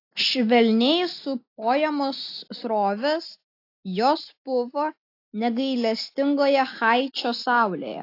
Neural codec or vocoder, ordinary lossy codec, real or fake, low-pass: none; AAC, 32 kbps; real; 5.4 kHz